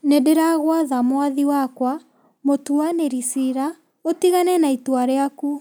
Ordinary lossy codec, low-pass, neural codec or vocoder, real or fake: none; none; none; real